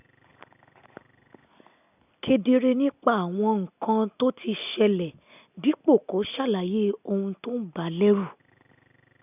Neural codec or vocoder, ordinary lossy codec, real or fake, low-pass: none; none; real; 3.6 kHz